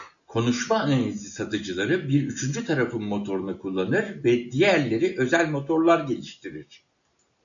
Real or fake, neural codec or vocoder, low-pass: real; none; 7.2 kHz